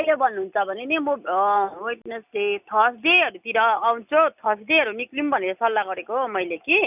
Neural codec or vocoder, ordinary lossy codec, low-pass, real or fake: none; none; 3.6 kHz; real